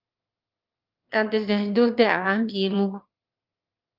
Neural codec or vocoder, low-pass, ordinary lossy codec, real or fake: autoencoder, 22.05 kHz, a latent of 192 numbers a frame, VITS, trained on one speaker; 5.4 kHz; Opus, 32 kbps; fake